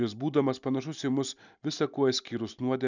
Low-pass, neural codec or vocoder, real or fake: 7.2 kHz; none; real